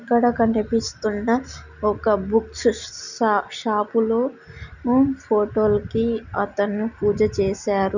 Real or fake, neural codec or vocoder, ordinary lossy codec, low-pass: real; none; none; 7.2 kHz